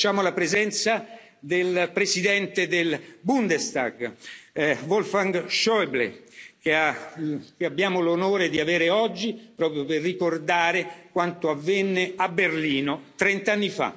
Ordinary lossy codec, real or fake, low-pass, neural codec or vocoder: none; real; none; none